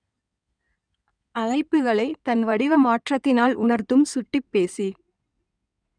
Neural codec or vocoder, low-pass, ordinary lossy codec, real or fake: codec, 16 kHz in and 24 kHz out, 2.2 kbps, FireRedTTS-2 codec; 9.9 kHz; none; fake